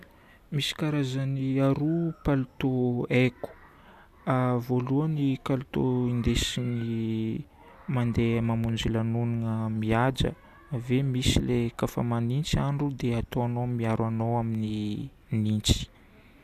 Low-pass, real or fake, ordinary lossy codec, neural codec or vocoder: 14.4 kHz; real; none; none